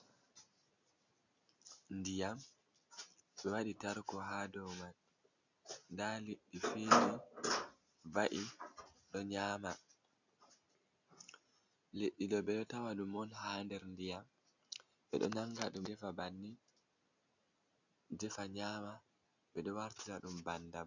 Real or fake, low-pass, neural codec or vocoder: real; 7.2 kHz; none